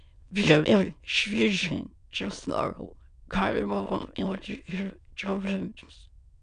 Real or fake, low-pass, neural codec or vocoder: fake; 9.9 kHz; autoencoder, 22.05 kHz, a latent of 192 numbers a frame, VITS, trained on many speakers